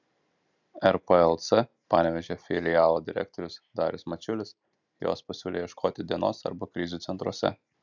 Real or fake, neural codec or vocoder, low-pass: real; none; 7.2 kHz